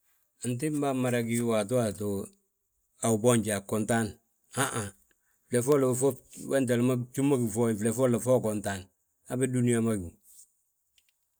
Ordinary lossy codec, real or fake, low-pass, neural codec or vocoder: none; real; none; none